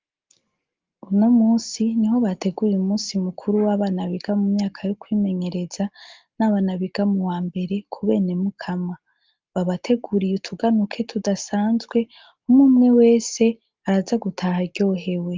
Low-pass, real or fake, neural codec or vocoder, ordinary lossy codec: 7.2 kHz; real; none; Opus, 32 kbps